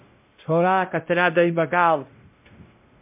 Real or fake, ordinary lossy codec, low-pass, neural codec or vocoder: fake; MP3, 32 kbps; 3.6 kHz; codec, 16 kHz, 0.5 kbps, X-Codec, WavLM features, trained on Multilingual LibriSpeech